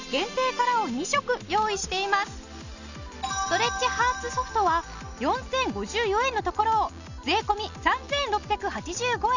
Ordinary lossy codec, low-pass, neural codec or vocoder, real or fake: none; 7.2 kHz; none; real